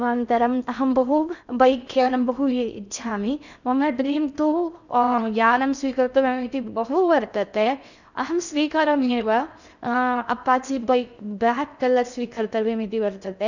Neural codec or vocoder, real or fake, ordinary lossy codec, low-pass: codec, 16 kHz in and 24 kHz out, 0.6 kbps, FocalCodec, streaming, 2048 codes; fake; none; 7.2 kHz